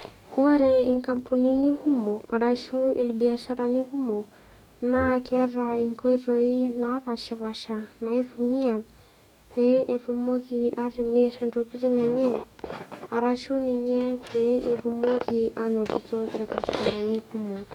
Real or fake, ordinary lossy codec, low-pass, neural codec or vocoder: fake; none; 19.8 kHz; codec, 44.1 kHz, 2.6 kbps, DAC